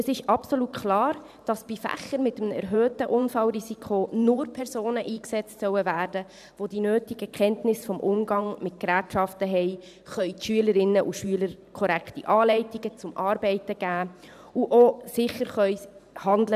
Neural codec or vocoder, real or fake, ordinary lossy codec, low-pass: vocoder, 44.1 kHz, 128 mel bands every 512 samples, BigVGAN v2; fake; none; 14.4 kHz